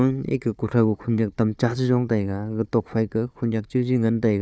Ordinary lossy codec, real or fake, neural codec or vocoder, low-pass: none; fake; codec, 16 kHz, 4 kbps, FunCodec, trained on Chinese and English, 50 frames a second; none